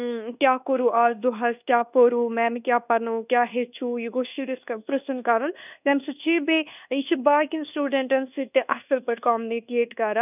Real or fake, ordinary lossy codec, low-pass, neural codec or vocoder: fake; none; 3.6 kHz; codec, 24 kHz, 1.2 kbps, DualCodec